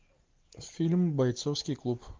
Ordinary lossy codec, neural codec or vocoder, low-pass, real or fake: Opus, 32 kbps; none; 7.2 kHz; real